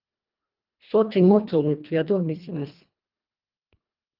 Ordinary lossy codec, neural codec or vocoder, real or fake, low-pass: Opus, 24 kbps; codec, 24 kHz, 1.5 kbps, HILCodec; fake; 5.4 kHz